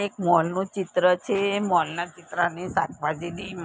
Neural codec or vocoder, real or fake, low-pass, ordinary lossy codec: none; real; none; none